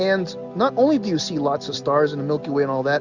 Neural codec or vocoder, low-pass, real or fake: none; 7.2 kHz; real